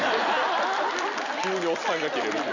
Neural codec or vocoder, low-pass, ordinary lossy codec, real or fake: none; 7.2 kHz; none; real